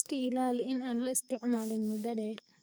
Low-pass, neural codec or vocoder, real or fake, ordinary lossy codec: none; codec, 44.1 kHz, 2.6 kbps, SNAC; fake; none